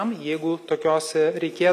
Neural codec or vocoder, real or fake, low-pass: none; real; 14.4 kHz